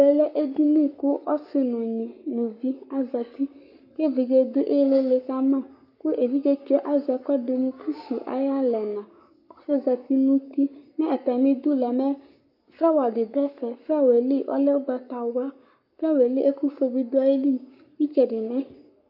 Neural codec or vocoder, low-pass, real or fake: codec, 44.1 kHz, 7.8 kbps, Pupu-Codec; 5.4 kHz; fake